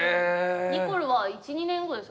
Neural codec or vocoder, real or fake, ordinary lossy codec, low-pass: none; real; none; none